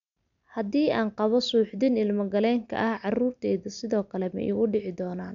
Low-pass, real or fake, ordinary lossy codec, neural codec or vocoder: 7.2 kHz; real; none; none